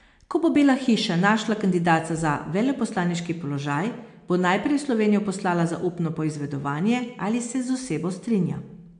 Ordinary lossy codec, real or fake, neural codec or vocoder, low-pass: AAC, 64 kbps; real; none; 9.9 kHz